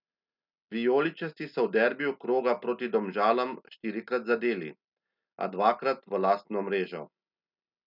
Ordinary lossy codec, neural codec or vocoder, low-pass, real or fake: none; none; 5.4 kHz; real